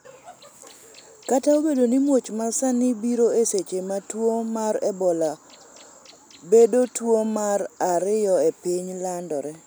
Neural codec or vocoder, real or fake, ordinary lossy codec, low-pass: none; real; none; none